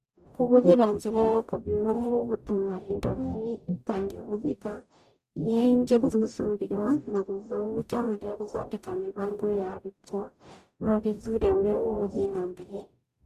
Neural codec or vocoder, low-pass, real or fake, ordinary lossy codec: codec, 44.1 kHz, 0.9 kbps, DAC; 14.4 kHz; fake; Opus, 64 kbps